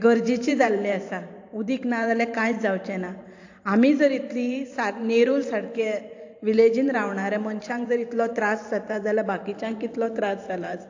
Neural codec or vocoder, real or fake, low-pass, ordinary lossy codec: vocoder, 44.1 kHz, 128 mel bands every 512 samples, BigVGAN v2; fake; 7.2 kHz; AAC, 48 kbps